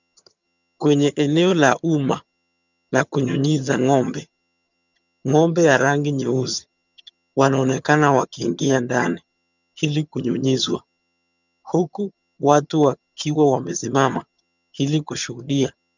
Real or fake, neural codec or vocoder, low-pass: fake; vocoder, 22.05 kHz, 80 mel bands, HiFi-GAN; 7.2 kHz